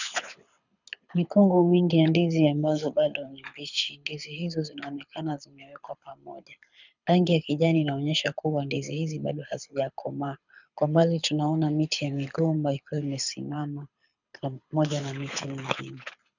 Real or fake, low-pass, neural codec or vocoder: fake; 7.2 kHz; codec, 24 kHz, 6 kbps, HILCodec